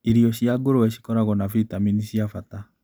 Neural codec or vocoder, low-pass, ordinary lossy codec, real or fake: none; none; none; real